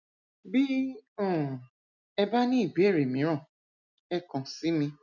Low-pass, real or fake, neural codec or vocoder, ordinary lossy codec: 7.2 kHz; real; none; none